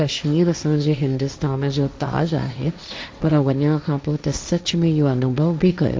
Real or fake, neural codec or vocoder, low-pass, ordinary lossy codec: fake; codec, 16 kHz, 1.1 kbps, Voila-Tokenizer; none; none